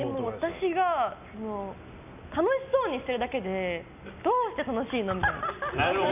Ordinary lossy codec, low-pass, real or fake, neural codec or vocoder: none; 3.6 kHz; real; none